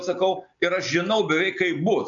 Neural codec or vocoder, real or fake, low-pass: none; real; 7.2 kHz